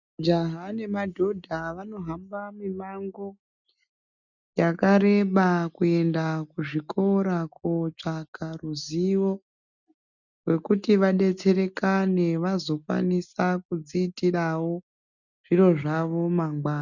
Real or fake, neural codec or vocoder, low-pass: real; none; 7.2 kHz